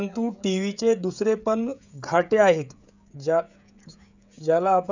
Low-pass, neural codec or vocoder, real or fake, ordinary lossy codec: 7.2 kHz; codec, 16 kHz, 16 kbps, FreqCodec, smaller model; fake; none